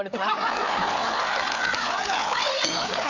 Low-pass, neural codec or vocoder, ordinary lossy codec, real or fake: 7.2 kHz; codec, 16 kHz, 4 kbps, FreqCodec, larger model; none; fake